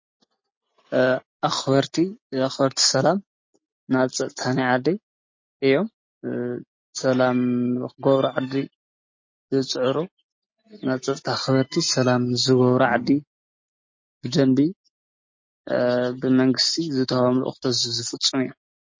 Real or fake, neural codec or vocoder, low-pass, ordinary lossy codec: real; none; 7.2 kHz; MP3, 32 kbps